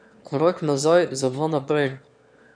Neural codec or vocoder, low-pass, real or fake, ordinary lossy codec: autoencoder, 22.05 kHz, a latent of 192 numbers a frame, VITS, trained on one speaker; 9.9 kHz; fake; none